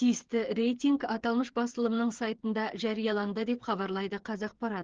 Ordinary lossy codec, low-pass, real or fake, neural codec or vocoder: Opus, 16 kbps; 7.2 kHz; fake; codec, 16 kHz, 8 kbps, FreqCodec, smaller model